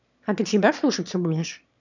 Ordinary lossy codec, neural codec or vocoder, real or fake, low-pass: none; autoencoder, 22.05 kHz, a latent of 192 numbers a frame, VITS, trained on one speaker; fake; 7.2 kHz